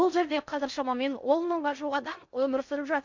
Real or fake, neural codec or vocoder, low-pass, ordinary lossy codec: fake; codec, 16 kHz in and 24 kHz out, 0.6 kbps, FocalCodec, streaming, 4096 codes; 7.2 kHz; MP3, 64 kbps